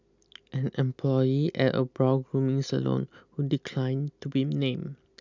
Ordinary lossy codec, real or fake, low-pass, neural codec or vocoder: none; real; 7.2 kHz; none